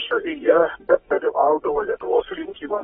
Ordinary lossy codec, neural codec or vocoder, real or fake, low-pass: AAC, 16 kbps; codec, 24 kHz, 0.9 kbps, WavTokenizer, medium music audio release; fake; 10.8 kHz